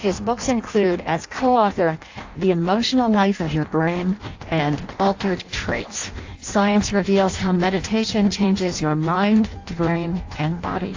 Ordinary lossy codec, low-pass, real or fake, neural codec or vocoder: AAC, 48 kbps; 7.2 kHz; fake; codec, 16 kHz in and 24 kHz out, 0.6 kbps, FireRedTTS-2 codec